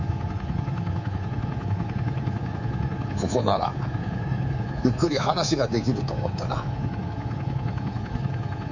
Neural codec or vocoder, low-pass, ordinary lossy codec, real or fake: codec, 24 kHz, 3.1 kbps, DualCodec; 7.2 kHz; none; fake